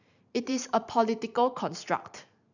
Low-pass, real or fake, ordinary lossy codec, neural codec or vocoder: 7.2 kHz; fake; none; vocoder, 44.1 kHz, 128 mel bands every 512 samples, BigVGAN v2